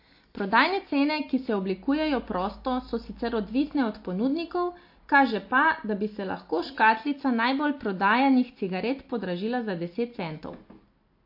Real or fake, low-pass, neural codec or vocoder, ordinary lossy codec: real; 5.4 kHz; none; MP3, 32 kbps